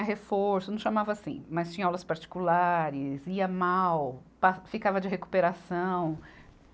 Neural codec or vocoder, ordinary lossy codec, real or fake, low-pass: none; none; real; none